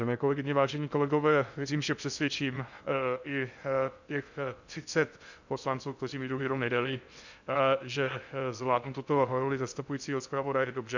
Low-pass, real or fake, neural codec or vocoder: 7.2 kHz; fake; codec, 16 kHz in and 24 kHz out, 0.6 kbps, FocalCodec, streaming, 2048 codes